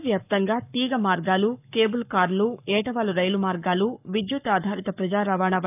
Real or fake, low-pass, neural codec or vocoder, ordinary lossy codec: fake; 3.6 kHz; codec, 44.1 kHz, 7.8 kbps, Pupu-Codec; none